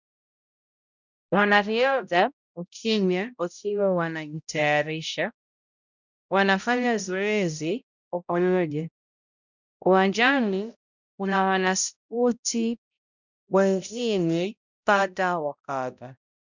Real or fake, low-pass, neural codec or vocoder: fake; 7.2 kHz; codec, 16 kHz, 0.5 kbps, X-Codec, HuBERT features, trained on balanced general audio